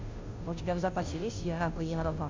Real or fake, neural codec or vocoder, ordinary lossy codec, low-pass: fake; codec, 16 kHz, 0.5 kbps, FunCodec, trained on Chinese and English, 25 frames a second; MP3, 64 kbps; 7.2 kHz